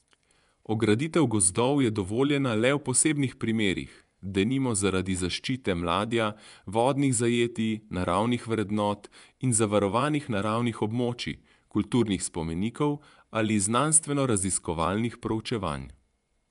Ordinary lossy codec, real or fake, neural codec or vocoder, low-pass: none; fake; vocoder, 24 kHz, 100 mel bands, Vocos; 10.8 kHz